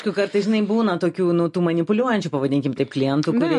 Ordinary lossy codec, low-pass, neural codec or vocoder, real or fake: MP3, 48 kbps; 14.4 kHz; none; real